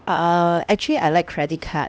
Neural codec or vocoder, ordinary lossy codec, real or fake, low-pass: codec, 16 kHz, 1 kbps, X-Codec, HuBERT features, trained on LibriSpeech; none; fake; none